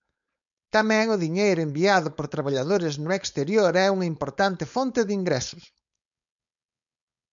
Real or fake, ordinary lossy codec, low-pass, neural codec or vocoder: fake; MP3, 96 kbps; 7.2 kHz; codec, 16 kHz, 4.8 kbps, FACodec